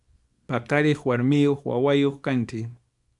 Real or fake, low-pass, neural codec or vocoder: fake; 10.8 kHz; codec, 24 kHz, 0.9 kbps, WavTokenizer, small release